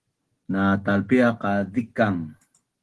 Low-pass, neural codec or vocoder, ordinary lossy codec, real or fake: 10.8 kHz; none; Opus, 16 kbps; real